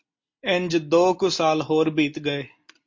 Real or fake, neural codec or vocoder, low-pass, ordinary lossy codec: real; none; 7.2 kHz; MP3, 48 kbps